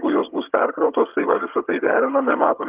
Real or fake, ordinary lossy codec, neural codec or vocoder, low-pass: fake; Opus, 32 kbps; vocoder, 22.05 kHz, 80 mel bands, HiFi-GAN; 3.6 kHz